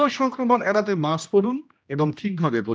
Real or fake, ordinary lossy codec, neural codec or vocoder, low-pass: fake; none; codec, 16 kHz, 1 kbps, X-Codec, HuBERT features, trained on general audio; none